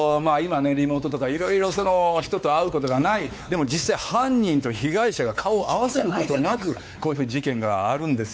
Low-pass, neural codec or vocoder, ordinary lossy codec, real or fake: none; codec, 16 kHz, 4 kbps, X-Codec, WavLM features, trained on Multilingual LibriSpeech; none; fake